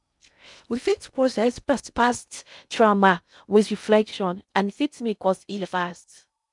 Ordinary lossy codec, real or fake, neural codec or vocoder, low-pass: none; fake; codec, 16 kHz in and 24 kHz out, 0.6 kbps, FocalCodec, streaming, 2048 codes; 10.8 kHz